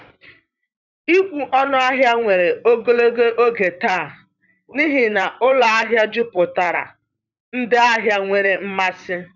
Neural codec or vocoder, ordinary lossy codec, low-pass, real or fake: vocoder, 44.1 kHz, 128 mel bands, Pupu-Vocoder; none; 7.2 kHz; fake